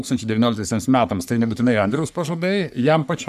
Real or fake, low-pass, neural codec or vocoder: fake; 14.4 kHz; codec, 44.1 kHz, 3.4 kbps, Pupu-Codec